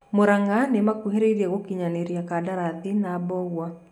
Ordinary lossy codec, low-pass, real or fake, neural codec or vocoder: none; 19.8 kHz; real; none